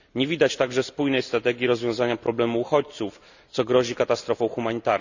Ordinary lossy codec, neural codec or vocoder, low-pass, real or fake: none; none; 7.2 kHz; real